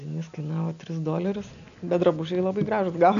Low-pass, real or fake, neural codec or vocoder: 7.2 kHz; real; none